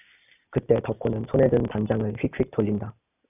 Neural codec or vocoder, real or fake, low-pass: none; real; 3.6 kHz